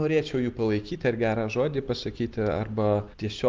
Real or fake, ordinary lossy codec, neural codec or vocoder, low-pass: real; Opus, 32 kbps; none; 7.2 kHz